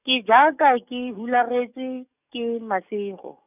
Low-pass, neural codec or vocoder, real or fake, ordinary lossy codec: 3.6 kHz; codec, 44.1 kHz, 7.8 kbps, DAC; fake; none